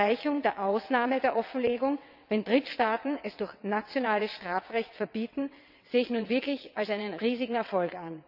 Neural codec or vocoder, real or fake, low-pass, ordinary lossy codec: vocoder, 22.05 kHz, 80 mel bands, WaveNeXt; fake; 5.4 kHz; none